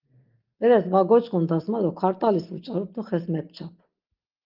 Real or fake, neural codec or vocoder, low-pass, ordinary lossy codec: real; none; 5.4 kHz; Opus, 32 kbps